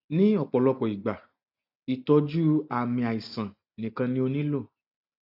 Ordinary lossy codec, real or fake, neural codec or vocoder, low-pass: none; real; none; 5.4 kHz